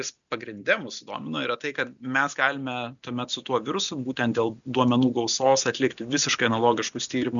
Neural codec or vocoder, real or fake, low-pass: none; real; 7.2 kHz